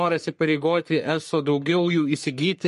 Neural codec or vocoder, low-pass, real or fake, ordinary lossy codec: codec, 44.1 kHz, 3.4 kbps, Pupu-Codec; 14.4 kHz; fake; MP3, 48 kbps